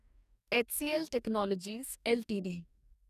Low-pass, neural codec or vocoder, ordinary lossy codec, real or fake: 14.4 kHz; codec, 44.1 kHz, 2.6 kbps, DAC; none; fake